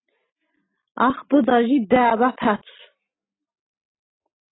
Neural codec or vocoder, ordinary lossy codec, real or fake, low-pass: none; AAC, 16 kbps; real; 7.2 kHz